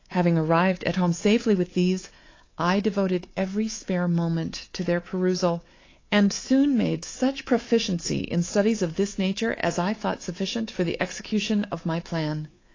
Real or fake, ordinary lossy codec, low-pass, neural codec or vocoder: fake; AAC, 32 kbps; 7.2 kHz; codec, 24 kHz, 3.1 kbps, DualCodec